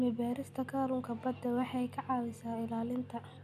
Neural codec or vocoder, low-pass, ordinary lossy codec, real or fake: none; 14.4 kHz; none; real